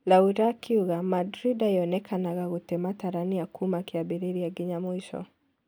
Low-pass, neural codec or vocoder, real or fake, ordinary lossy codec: none; none; real; none